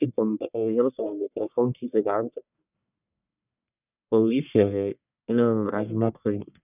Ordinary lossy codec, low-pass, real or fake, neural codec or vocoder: none; 3.6 kHz; fake; codec, 44.1 kHz, 1.7 kbps, Pupu-Codec